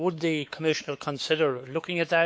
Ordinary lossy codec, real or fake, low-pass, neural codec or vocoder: none; fake; none; codec, 16 kHz, 4 kbps, X-Codec, WavLM features, trained on Multilingual LibriSpeech